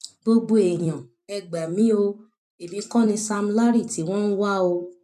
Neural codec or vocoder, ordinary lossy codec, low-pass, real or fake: vocoder, 44.1 kHz, 128 mel bands every 256 samples, BigVGAN v2; none; 14.4 kHz; fake